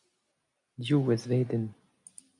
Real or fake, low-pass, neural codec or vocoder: real; 10.8 kHz; none